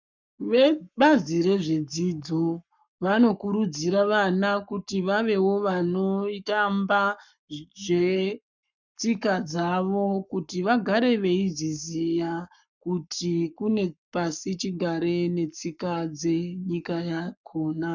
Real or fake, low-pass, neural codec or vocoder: fake; 7.2 kHz; codec, 44.1 kHz, 7.8 kbps, Pupu-Codec